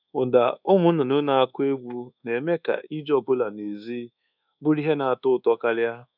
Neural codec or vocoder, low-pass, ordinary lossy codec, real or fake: codec, 24 kHz, 1.2 kbps, DualCodec; 5.4 kHz; none; fake